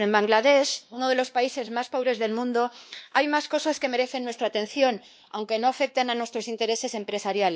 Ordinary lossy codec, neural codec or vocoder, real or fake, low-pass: none; codec, 16 kHz, 2 kbps, X-Codec, WavLM features, trained on Multilingual LibriSpeech; fake; none